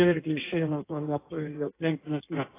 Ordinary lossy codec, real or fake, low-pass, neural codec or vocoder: AAC, 16 kbps; fake; 3.6 kHz; codec, 16 kHz in and 24 kHz out, 1.1 kbps, FireRedTTS-2 codec